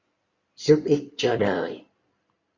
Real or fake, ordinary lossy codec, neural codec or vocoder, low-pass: fake; Opus, 64 kbps; codec, 16 kHz in and 24 kHz out, 2.2 kbps, FireRedTTS-2 codec; 7.2 kHz